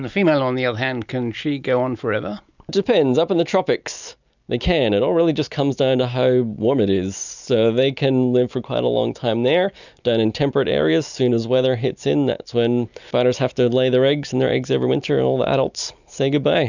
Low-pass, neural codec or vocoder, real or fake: 7.2 kHz; none; real